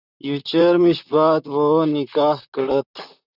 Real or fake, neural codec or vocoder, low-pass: fake; vocoder, 44.1 kHz, 128 mel bands, Pupu-Vocoder; 5.4 kHz